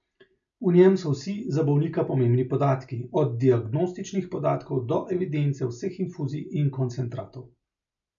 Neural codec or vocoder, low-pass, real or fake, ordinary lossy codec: none; 7.2 kHz; real; none